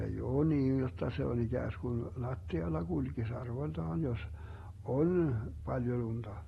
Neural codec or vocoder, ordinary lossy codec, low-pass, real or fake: none; AAC, 32 kbps; 19.8 kHz; real